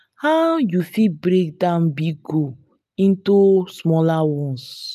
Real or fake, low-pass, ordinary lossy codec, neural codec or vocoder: real; 14.4 kHz; none; none